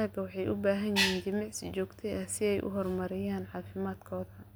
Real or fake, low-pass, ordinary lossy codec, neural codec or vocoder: real; none; none; none